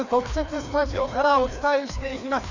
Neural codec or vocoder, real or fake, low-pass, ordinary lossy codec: codec, 16 kHz, 2 kbps, FreqCodec, larger model; fake; 7.2 kHz; none